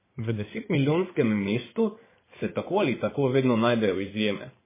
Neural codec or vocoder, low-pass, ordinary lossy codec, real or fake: codec, 16 kHz in and 24 kHz out, 2.2 kbps, FireRedTTS-2 codec; 3.6 kHz; MP3, 16 kbps; fake